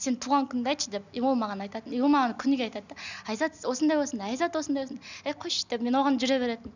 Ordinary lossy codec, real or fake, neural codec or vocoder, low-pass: none; real; none; 7.2 kHz